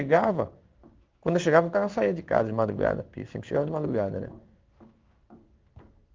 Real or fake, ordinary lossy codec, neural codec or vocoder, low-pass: fake; Opus, 32 kbps; codec, 16 kHz in and 24 kHz out, 1 kbps, XY-Tokenizer; 7.2 kHz